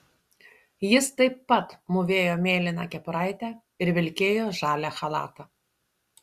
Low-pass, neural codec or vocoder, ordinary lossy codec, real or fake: 14.4 kHz; none; Opus, 64 kbps; real